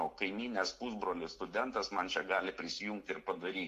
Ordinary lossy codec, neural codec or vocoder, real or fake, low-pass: AAC, 64 kbps; none; real; 14.4 kHz